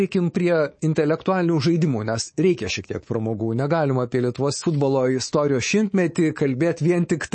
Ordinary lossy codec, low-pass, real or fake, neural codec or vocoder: MP3, 32 kbps; 9.9 kHz; fake; codec, 24 kHz, 3.1 kbps, DualCodec